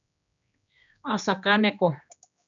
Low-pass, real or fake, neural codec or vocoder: 7.2 kHz; fake; codec, 16 kHz, 2 kbps, X-Codec, HuBERT features, trained on general audio